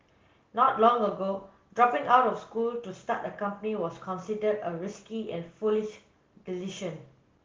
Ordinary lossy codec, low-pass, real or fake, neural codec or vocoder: Opus, 16 kbps; 7.2 kHz; real; none